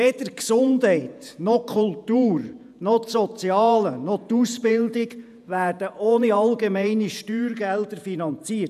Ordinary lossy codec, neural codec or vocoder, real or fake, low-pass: none; vocoder, 48 kHz, 128 mel bands, Vocos; fake; 14.4 kHz